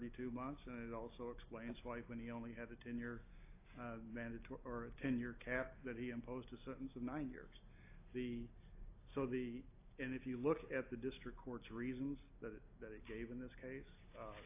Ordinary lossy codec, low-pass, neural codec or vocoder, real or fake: AAC, 24 kbps; 3.6 kHz; none; real